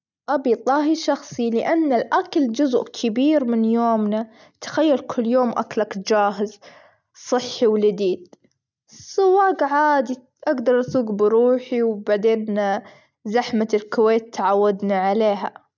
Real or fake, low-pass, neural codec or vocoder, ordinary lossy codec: real; 7.2 kHz; none; none